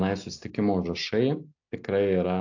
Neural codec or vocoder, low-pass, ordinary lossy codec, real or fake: none; 7.2 kHz; AAC, 48 kbps; real